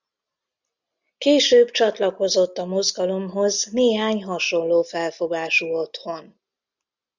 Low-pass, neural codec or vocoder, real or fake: 7.2 kHz; none; real